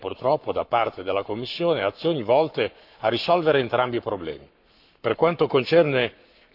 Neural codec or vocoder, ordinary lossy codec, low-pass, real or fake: codec, 44.1 kHz, 7.8 kbps, Pupu-Codec; none; 5.4 kHz; fake